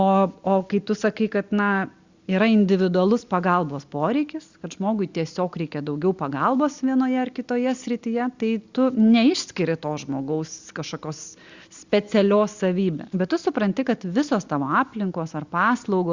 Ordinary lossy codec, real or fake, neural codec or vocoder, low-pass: Opus, 64 kbps; real; none; 7.2 kHz